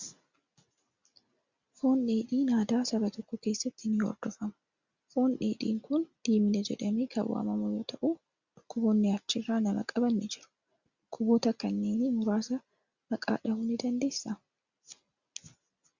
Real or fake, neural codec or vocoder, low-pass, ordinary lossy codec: real; none; 7.2 kHz; Opus, 64 kbps